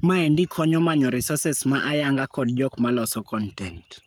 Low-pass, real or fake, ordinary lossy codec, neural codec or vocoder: none; fake; none; codec, 44.1 kHz, 7.8 kbps, Pupu-Codec